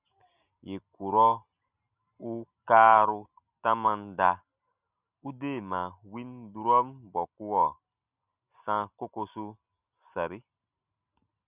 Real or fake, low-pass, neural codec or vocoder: real; 3.6 kHz; none